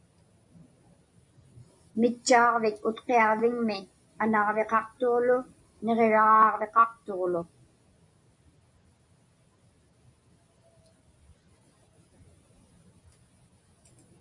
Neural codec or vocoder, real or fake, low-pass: none; real; 10.8 kHz